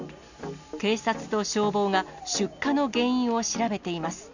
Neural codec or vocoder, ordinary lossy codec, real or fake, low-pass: none; none; real; 7.2 kHz